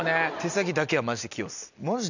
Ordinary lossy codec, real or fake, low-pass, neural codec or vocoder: none; real; 7.2 kHz; none